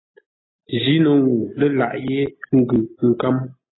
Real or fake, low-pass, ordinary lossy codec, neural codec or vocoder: real; 7.2 kHz; AAC, 16 kbps; none